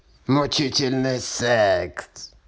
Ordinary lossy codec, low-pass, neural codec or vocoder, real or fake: none; none; none; real